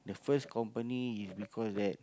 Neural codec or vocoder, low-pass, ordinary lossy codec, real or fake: none; none; none; real